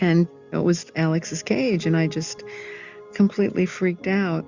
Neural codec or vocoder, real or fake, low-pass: none; real; 7.2 kHz